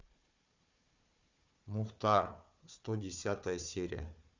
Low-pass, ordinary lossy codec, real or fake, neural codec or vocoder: 7.2 kHz; Opus, 64 kbps; fake; codec, 16 kHz, 4 kbps, FunCodec, trained on Chinese and English, 50 frames a second